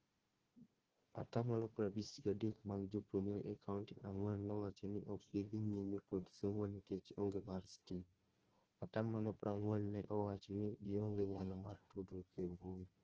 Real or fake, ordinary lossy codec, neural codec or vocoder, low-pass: fake; Opus, 16 kbps; codec, 16 kHz, 1 kbps, FunCodec, trained on Chinese and English, 50 frames a second; 7.2 kHz